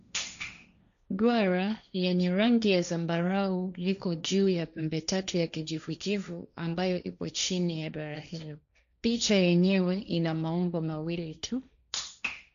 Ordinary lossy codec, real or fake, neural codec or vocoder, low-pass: none; fake; codec, 16 kHz, 1.1 kbps, Voila-Tokenizer; 7.2 kHz